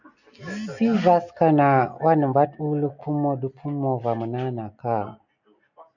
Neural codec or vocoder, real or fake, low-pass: none; real; 7.2 kHz